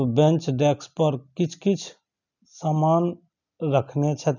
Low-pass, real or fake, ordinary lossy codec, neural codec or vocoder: 7.2 kHz; real; none; none